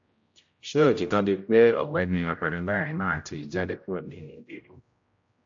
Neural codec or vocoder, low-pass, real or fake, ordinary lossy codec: codec, 16 kHz, 0.5 kbps, X-Codec, HuBERT features, trained on general audio; 7.2 kHz; fake; MP3, 48 kbps